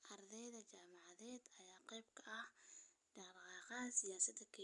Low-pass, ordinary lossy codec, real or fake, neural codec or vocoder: 10.8 kHz; none; real; none